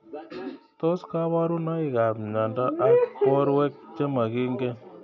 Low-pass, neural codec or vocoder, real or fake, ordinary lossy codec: 7.2 kHz; none; real; none